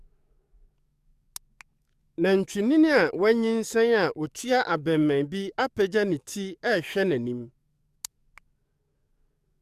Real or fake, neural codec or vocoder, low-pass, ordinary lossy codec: fake; codec, 44.1 kHz, 7.8 kbps, DAC; 14.4 kHz; Opus, 64 kbps